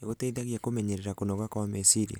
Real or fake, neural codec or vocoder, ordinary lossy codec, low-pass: real; none; none; none